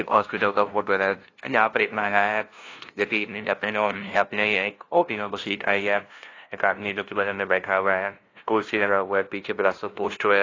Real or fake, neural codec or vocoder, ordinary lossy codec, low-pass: fake; codec, 16 kHz, 0.5 kbps, FunCodec, trained on LibriTTS, 25 frames a second; AAC, 32 kbps; 7.2 kHz